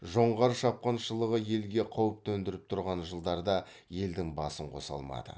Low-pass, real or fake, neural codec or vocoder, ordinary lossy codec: none; real; none; none